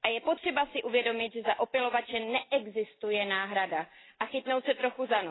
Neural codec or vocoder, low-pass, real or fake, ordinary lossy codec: none; 7.2 kHz; real; AAC, 16 kbps